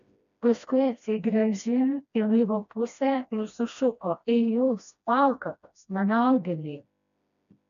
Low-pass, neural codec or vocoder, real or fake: 7.2 kHz; codec, 16 kHz, 1 kbps, FreqCodec, smaller model; fake